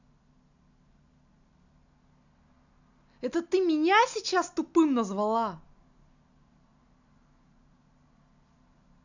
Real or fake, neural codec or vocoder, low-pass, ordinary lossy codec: real; none; 7.2 kHz; none